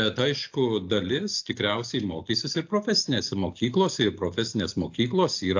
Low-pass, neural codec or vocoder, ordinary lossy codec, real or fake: 7.2 kHz; none; AAC, 48 kbps; real